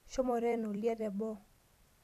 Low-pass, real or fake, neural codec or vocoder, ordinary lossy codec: 14.4 kHz; fake; vocoder, 44.1 kHz, 128 mel bands every 256 samples, BigVGAN v2; none